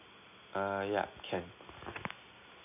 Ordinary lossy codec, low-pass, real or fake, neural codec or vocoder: none; 3.6 kHz; real; none